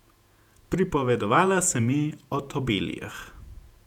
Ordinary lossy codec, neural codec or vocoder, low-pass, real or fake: none; vocoder, 48 kHz, 128 mel bands, Vocos; 19.8 kHz; fake